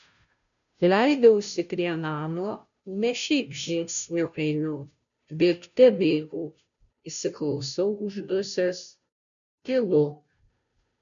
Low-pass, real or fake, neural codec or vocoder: 7.2 kHz; fake; codec, 16 kHz, 0.5 kbps, FunCodec, trained on Chinese and English, 25 frames a second